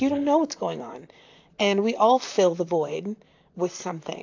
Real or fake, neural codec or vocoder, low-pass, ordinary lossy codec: fake; vocoder, 44.1 kHz, 128 mel bands, Pupu-Vocoder; 7.2 kHz; AAC, 48 kbps